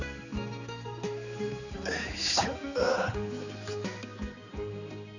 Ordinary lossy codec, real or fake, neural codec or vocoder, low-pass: none; fake; codec, 16 kHz, 4 kbps, X-Codec, HuBERT features, trained on general audio; 7.2 kHz